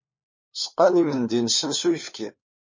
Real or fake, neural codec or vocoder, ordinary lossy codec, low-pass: fake; codec, 16 kHz, 4 kbps, FunCodec, trained on LibriTTS, 50 frames a second; MP3, 32 kbps; 7.2 kHz